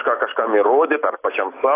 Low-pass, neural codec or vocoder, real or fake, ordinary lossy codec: 3.6 kHz; none; real; AAC, 24 kbps